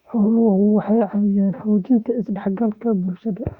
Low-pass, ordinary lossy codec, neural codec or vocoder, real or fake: 19.8 kHz; Opus, 64 kbps; autoencoder, 48 kHz, 32 numbers a frame, DAC-VAE, trained on Japanese speech; fake